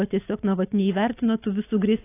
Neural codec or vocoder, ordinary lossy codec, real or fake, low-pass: none; AAC, 24 kbps; real; 3.6 kHz